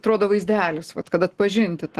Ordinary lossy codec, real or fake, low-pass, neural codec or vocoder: Opus, 16 kbps; real; 14.4 kHz; none